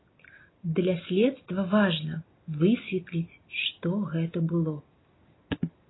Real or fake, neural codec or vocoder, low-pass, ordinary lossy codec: real; none; 7.2 kHz; AAC, 16 kbps